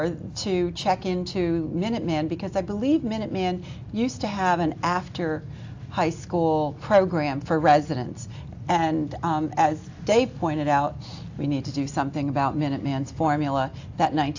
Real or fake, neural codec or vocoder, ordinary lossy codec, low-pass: real; none; AAC, 48 kbps; 7.2 kHz